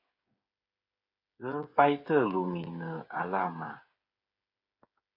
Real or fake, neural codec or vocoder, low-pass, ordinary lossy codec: fake; codec, 16 kHz, 8 kbps, FreqCodec, smaller model; 5.4 kHz; MP3, 32 kbps